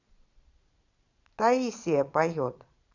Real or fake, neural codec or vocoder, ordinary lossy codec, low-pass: fake; vocoder, 22.05 kHz, 80 mel bands, Vocos; none; 7.2 kHz